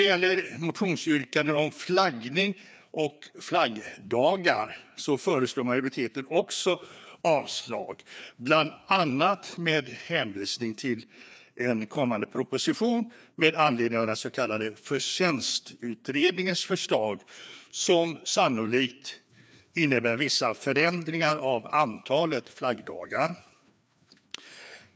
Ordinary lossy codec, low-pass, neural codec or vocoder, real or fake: none; none; codec, 16 kHz, 2 kbps, FreqCodec, larger model; fake